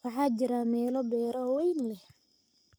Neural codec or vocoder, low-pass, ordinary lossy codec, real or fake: codec, 44.1 kHz, 7.8 kbps, Pupu-Codec; none; none; fake